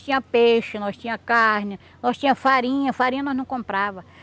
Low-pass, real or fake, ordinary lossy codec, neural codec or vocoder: none; real; none; none